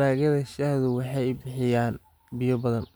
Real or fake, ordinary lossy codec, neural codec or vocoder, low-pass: real; none; none; none